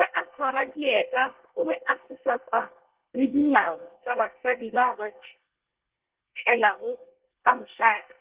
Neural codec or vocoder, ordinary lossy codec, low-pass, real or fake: codec, 24 kHz, 1 kbps, SNAC; Opus, 16 kbps; 3.6 kHz; fake